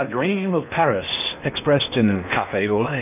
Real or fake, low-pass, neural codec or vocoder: fake; 3.6 kHz; codec, 16 kHz in and 24 kHz out, 0.8 kbps, FocalCodec, streaming, 65536 codes